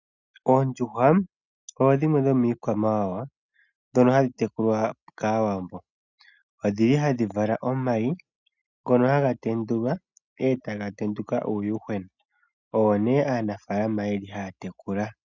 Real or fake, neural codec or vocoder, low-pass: real; none; 7.2 kHz